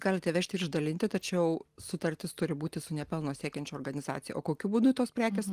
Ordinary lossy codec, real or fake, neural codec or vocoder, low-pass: Opus, 24 kbps; real; none; 14.4 kHz